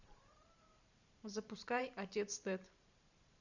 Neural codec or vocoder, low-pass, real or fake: vocoder, 44.1 kHz, 128 mel bands every 512 samples, BigVGAN v2; 7.2 kHz; fake